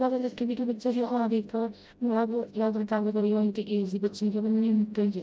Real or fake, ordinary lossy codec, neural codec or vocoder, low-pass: fake; none; codec, 16 kHz, 0.5 kbps, FreqCodec, smaller model; none